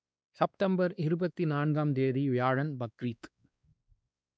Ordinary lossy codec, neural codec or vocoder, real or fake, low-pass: none; codec, 16 kHz, 2 kbps, X-Codec, WavLM features, trained on Multilingual LibriSpeech; fake; none